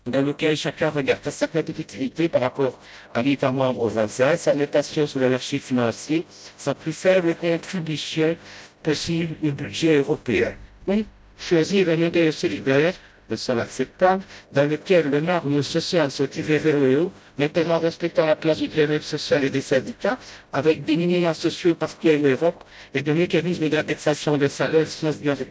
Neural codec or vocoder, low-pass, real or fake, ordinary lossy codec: codec, 16 kHz, 0.5 kbps, FreqCodec, smaller model; none; fake; none